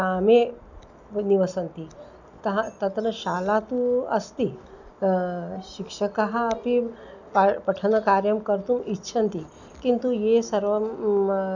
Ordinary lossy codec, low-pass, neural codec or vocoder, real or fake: none; 7.2 kHz; none; real